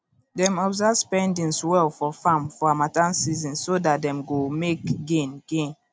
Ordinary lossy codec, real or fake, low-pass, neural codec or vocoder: none; real; none; none